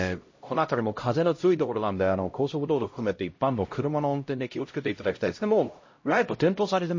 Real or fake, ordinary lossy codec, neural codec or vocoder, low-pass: fake; MP3, 32 kbps; codec, 16 kHz, 0.5 kbps, X-Codec, HuBERT features, trained on LibriSpeech; 7.2 kHz